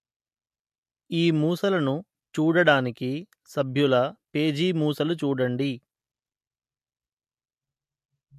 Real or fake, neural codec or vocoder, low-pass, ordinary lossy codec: real; none; 14.4 kHz; MP3, 64 kbps